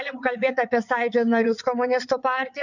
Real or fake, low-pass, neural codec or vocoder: fake; 7.2 kHz; vocoder, 22.05 kHz, 80 mel bands, WaveNeXt